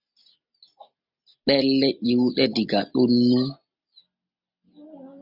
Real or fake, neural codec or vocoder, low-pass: real; none; 5.4 kHz